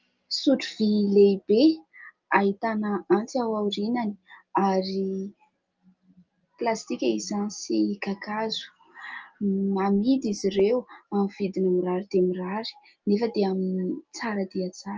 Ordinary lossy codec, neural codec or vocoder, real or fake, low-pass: Opus, 24 kbps; none; real; 7.2 kHz